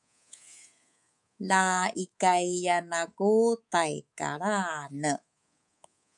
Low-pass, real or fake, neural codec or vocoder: 10.8 kHz; fake; codec, 24 kHz, 3.1 kbps, DualCodec